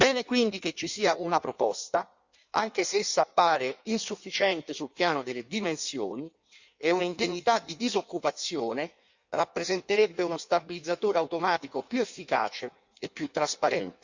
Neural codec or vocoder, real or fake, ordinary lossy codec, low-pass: codec, 16 kHz in and 24 kHz out, 1.1 kbps, FireRedTTS-2 codec; fake; Opus, 64 kbps; 7.2 kHz